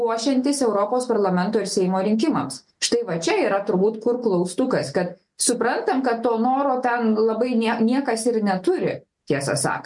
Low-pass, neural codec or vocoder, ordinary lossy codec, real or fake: 10.8 kHz; none; MP3, 64 kbps; real